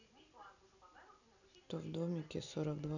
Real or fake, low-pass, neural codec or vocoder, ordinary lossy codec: real; 7.2 kHz; none; none